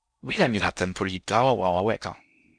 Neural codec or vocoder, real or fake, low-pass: codec, 16 kHz in and 24 kHz out, 0.6 kbps, FocalCodec, streaming, 4096 codes; fake; 9.9 kHz